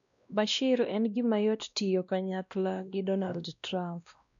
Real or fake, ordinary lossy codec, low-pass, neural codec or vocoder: fake; none; 7.2 kHz; codec, 16 kHz, 1 kbps, X-Codec, WavLM features, trained on Multilingual LibriSpeech